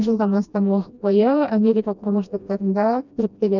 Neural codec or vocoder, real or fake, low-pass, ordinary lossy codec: codec, 16 kHz, 1 kbps, FreqCodec, smaller model; fake; 7.2 kHz; none